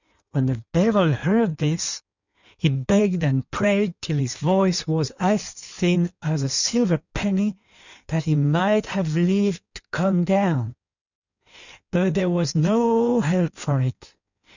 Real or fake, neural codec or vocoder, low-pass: fake; codec, 16 kHz in and 24 kHz out, 1.1 kbps, FireRedTTS-2 codec; 7.2 kHz